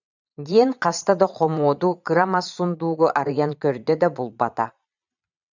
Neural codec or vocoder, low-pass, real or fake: vocoder, 22.05 kHz, 80 mel bands, Vocos; 7.2 kHz; fake